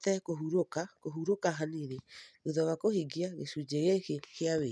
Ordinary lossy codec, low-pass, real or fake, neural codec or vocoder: none; none; real; none